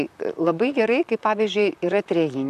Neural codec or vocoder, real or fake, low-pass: vocoder, 44.1 kHz, 128 mel bands, Pupu-Vocoder; fake; 14.4 kHz